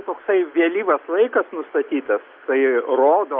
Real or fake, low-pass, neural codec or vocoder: real; 5.4 kHz; none